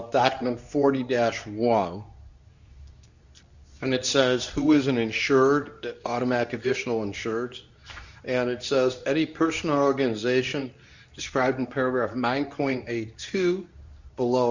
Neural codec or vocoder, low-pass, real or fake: codec, 24 kHz, 0.9 kbps, WavTokenizer, medium speech release version 2; 7.2 kHz; fake